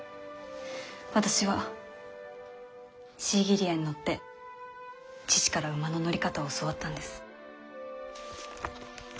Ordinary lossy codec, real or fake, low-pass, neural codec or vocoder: none; real; none; none